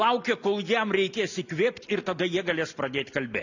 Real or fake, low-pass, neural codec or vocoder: real; 7.2 kHz; none